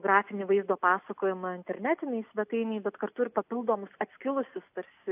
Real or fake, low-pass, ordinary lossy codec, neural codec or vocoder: real; 3.6 kHz; AAC, 32 kbps; none